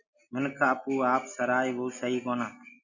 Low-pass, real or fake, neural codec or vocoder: 7.2 kHz; real; none